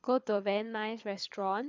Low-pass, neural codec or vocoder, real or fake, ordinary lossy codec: 7.2 kHz; codec, 24 kHz, 6 kbps, HILCodec; fake; MP3, 64 kbps